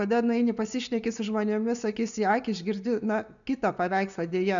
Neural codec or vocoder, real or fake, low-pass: none; real; 7.2 kHz